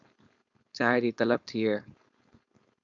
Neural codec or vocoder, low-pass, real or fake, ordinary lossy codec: codec, 16 kHz, 4.8 kbps, FACodec; 7.2 kHz; fake; AAC, 64 kbps